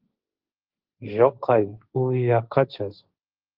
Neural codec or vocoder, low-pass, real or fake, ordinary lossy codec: codec, 16 kHz, 1.1 kbps, Voila-Tokenizer; 5.4 kHz; fake; Opus, 32 kbps